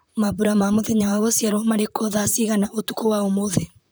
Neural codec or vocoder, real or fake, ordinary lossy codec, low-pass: vocoder, 44.1 kHz, 128 mel bands, Pupu-Vocoder; fake; none; none